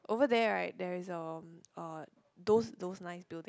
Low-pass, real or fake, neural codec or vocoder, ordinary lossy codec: none; real; none; none